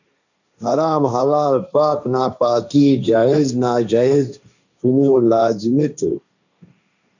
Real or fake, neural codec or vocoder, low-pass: fake; codec, 16 kHz, 1.1 kbps, Voila-Tokenizer; 7.2 kHz